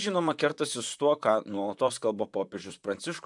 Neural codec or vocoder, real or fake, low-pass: vocoder, 44.1 kHz, 128 mel bands, Pupu-Vocoder; fake; 10.8 kHz